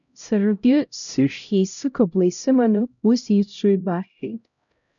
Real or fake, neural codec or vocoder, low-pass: fake; codec, 16 kHz, 0.5 kbps, X-Codec, HuBERT features, trained on LibriSpeech; 7.2 kHz